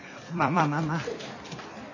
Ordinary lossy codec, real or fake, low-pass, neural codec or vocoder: none; fake; 7.2 kHz; vocoder, 44.1 kHz, 128 mel bands every 256 samples, BigVGAN v2